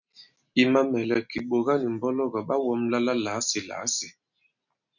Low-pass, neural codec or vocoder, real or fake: 7.2 kHz; none; real